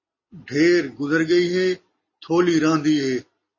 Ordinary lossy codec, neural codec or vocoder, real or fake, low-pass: MP3, 32 kbps; none; real; 7.2 kHz